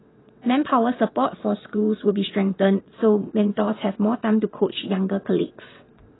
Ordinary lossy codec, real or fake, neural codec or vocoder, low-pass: AAC, 16 kbps; fake; vocoder, 22.05 kHz, 80 mel bands, WaveNeXt; 7.2 kHz